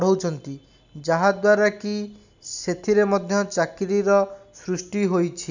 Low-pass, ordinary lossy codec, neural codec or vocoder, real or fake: 7.2 kHz; none; none; real